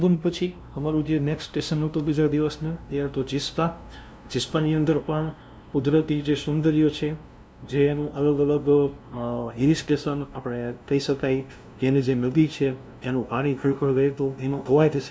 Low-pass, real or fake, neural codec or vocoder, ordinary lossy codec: none; fake; codec, 16 kHz, 0.5 kbps, FunCodec, trained on LibriTTS, 25 frames a second; none